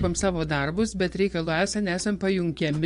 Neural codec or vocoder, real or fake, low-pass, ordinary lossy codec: vocoder, 24 kHz, 100 mel bands, Vocos; fake; 10.8 kHz; MP3, 64 kbps